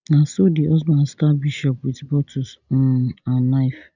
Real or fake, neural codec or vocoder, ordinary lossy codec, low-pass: real; none; none; 7.2 kHz